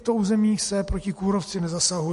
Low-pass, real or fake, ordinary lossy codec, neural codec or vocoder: 14.4 kHz; real; MP3, 48 kbps; none